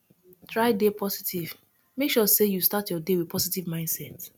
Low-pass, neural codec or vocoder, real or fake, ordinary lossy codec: none; none; real; none